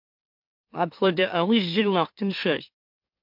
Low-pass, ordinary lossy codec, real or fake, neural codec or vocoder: 5.4 kHz; MP3, 48 kbps; fake; autoencoder, 44.1 kHz, a latent of 192 numbers a frame, MeloTTS